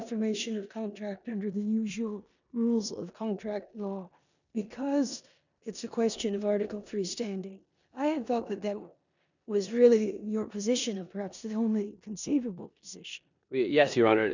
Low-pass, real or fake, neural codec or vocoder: 7.2 kHz; fake; codec, 16 kHz in and 24 kHz out, 0.9 kbps, LongCat-Audio-Codec, four codebook decoder